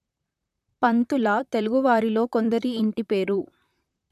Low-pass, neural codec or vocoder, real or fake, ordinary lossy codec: 14.4 kHz; vocoder, 44.1 kHz, 128 mel bands, Pupu-Vocoder; fake; none